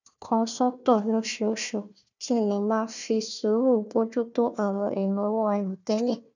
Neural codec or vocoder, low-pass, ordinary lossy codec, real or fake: codec, 16 kHz, 1 kbps, FunCodec, trained on Chinese and English, 50 frames a second; 7.2 kHz; none; fake